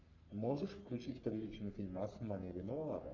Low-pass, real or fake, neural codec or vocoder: 7.2 kHz; fake; codec, 44.1 kHz, 3.4 kbps, Pupu-Codec